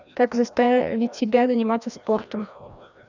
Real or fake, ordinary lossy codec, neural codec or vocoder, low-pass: fake; none; codec, 16 kHz, 1 kbps, FreqCodec, larger model; 7.2 kHz